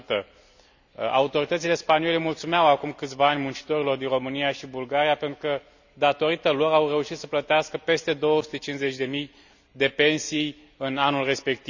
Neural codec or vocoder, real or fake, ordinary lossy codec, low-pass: none; real; none; 7.2 kHz